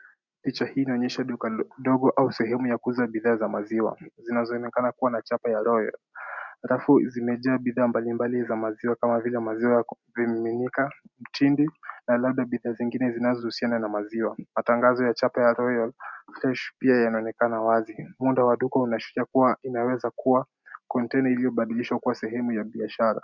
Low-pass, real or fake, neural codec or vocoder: 7.2 kHz; real; none